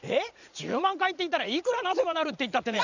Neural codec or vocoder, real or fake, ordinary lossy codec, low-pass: vocoder, 44.1 kHz, 80 mel bands, Vocos; fake; none; 7.2 kHz